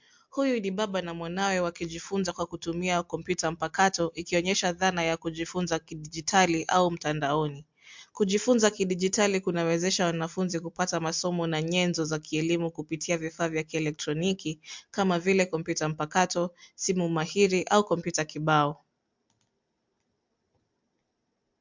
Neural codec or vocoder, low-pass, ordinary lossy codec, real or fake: none; 7.2 kHz; MP3, 64 kbps; real